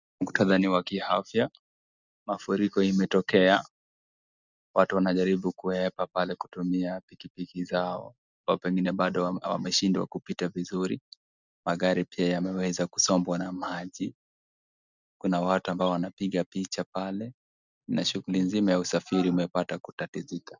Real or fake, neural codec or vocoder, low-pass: real; none; 7.2 kHz